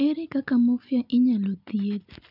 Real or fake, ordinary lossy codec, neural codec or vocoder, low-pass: real; none; none; 5.4 kHz